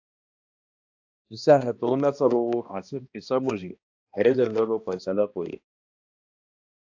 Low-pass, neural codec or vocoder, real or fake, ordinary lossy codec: 7.2 kHz; codec, 16 kHz, 1 kbps, X-Codec, HuBERT features, trained on balanced general audio; fake; AAC, 48 kbps